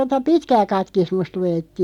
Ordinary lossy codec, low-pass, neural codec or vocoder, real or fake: none; 19.8 kHz; none; real